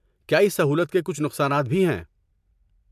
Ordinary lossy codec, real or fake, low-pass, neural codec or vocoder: MP3, 96 kbps; real; 14.4 kHz; none